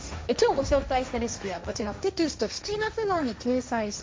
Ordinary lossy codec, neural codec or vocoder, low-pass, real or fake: none; codec, 16 kHz, 1.1 kbps, Voila-Tokenizer; none; fake